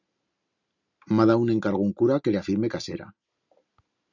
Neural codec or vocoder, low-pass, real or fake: none; 7.2 kHz; real